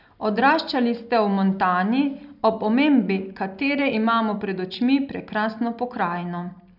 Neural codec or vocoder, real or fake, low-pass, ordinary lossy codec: none; real; 5.4 kHz; none